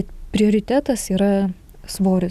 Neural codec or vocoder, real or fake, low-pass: none; real; 14.4 kHz